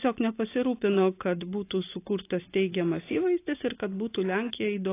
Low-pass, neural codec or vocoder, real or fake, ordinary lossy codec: 3.6 kHz; none; real; AAC, 24 kbps